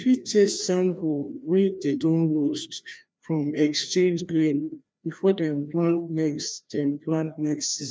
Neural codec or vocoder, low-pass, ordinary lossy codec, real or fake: codec, 16 kHz, 1 kbps, FreqCodec, larger model; none; none; fake